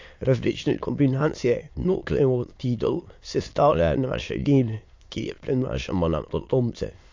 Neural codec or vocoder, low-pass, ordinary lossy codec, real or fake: autoencoder, 22.05 kHz, a latent of 192 numbers a frame, VITS, trained on many speakers; 7.2 kHz; MP3, 48 kbps; fake